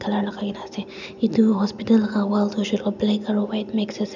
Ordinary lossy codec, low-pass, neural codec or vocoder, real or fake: none; 7.2 kHz; none; real